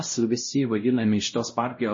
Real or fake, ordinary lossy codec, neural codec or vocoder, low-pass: fake; MP3, 32 kbps; codec, 16 kHz, 0.5 kbps, X-Codec, WavLM features, trained on Multilingual LibriSpeech; 7.2 kHz